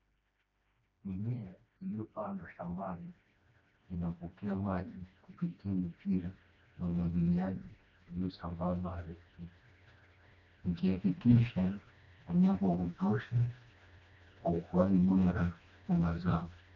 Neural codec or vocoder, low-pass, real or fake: codec, 16 kHz, 1 kbps, FreqCodec, smaller model; 7.2 kHz; fake